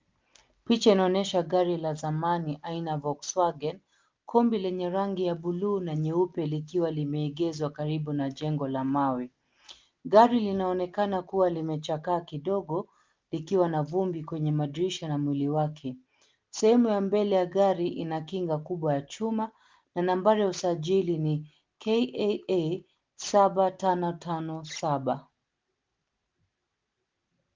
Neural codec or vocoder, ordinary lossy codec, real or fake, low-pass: none; Opus, 32 kbps; real; 7.2 kHz